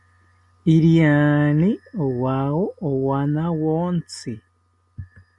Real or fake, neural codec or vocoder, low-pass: real; none; 10.8 kHz